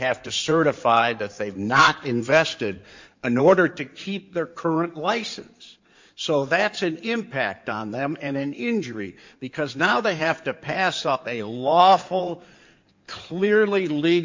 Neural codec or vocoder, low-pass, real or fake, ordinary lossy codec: codec, 16 kHz in and 24 kHz out, 2.2 kbps, FireRedTTS-2 codec; 7.2 kHz; fake; MP3, 48 kbps